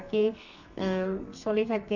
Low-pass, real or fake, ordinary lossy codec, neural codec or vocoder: 7.2 kHz; fake; none; codec, 32 kHz, 1.9 kbps, SNAC